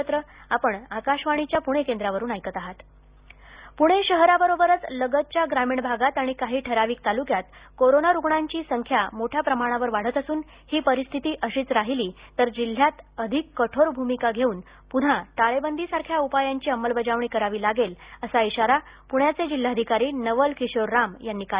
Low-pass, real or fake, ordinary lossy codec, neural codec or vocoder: 3.6 kHz; real; Opus, 64 kbps; none